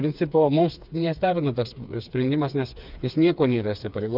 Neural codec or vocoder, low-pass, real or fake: codec, 16 kHz, 4 kbps, FreqCodec, smaller model; 5.4 kHz; fake